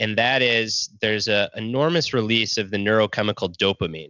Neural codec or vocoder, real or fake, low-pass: none; real; 7.2 kHz